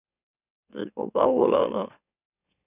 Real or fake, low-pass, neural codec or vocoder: fake; 3.6 kHz; autoencoder, 44.1 kHz, a latent of 192 numbers a frame, MeloTTS